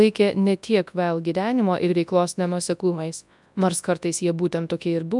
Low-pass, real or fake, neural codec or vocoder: 10.8 kHz; fake; codec, 24 kHz, 0.9 kbps, WavTokenizer, large speech release